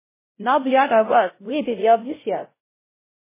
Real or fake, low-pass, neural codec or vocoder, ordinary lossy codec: fake; 3.6 kHz; codec, 16 kHz, 0.5 kbps, X-Codec, WavLM features, trained on Multilingual LibriSpeech; MP3, 16 kbps